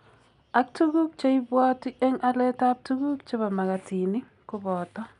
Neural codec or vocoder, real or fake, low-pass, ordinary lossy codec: none; real; 10.8 kHz; none